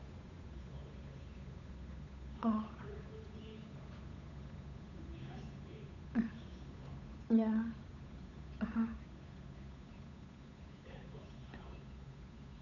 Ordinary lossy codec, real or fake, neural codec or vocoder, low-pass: none; fake; codec, 16 kHz, 8 kbps, FunCodec, trained on Chinese and English, 25 frames a second; 7.2 kHz